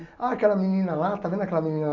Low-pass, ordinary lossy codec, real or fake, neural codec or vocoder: 7.2 kHz; none; real; none